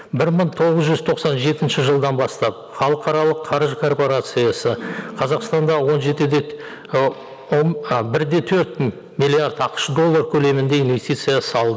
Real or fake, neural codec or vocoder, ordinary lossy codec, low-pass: real; none; none; none